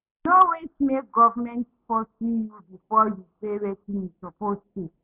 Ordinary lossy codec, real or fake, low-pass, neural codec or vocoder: none; real; 3.6 kHz; none